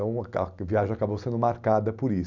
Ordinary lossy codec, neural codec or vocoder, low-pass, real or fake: none; none; 7.2 kHz; real